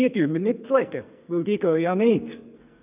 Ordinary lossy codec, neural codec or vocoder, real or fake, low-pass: none; codec, 44.1 kHz, 2.6 kbps, SNAC; fake; 3.6 kHz